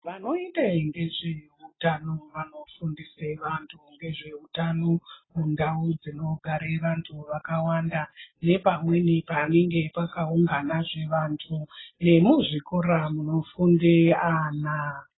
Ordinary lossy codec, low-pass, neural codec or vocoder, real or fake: AAC, 16 kbps; 7.2 kHz; none; real